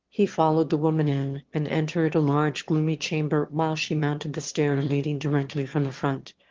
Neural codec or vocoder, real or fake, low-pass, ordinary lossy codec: autoencoder, 22.05 kHz, a latent of 192 numbers a frame, VITS, trained on one speaker; fake; 7.2 kHz; Opus, 16 kbps